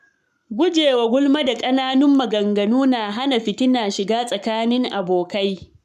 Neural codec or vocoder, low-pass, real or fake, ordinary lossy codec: codec, 44.1 kHz, 7.8 kbps, Pupu-Codec; 14.4 kHz; fake; none